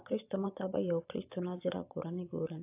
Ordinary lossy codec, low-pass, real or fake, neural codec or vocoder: AAC, 32 kbps; 3.6 kHz; fake; vocoder, 22.05 kHz, 80 mel bands, Vocos